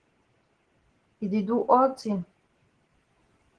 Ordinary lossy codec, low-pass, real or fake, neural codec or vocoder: Opus, 16 kbps; 9.9 kHz; real; none